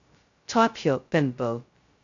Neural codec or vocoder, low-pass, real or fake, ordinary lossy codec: codec, 16 kHz, 0.2 kbps, FocalCodec; 7.2 kHz; fake; AAC, 48 kbps